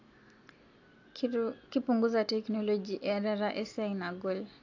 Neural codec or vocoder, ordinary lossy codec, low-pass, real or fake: none; AAC, 48 kbps; 7.2 kHz; real